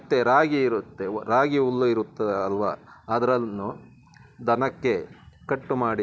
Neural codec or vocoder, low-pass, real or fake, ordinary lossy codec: none; none; real; none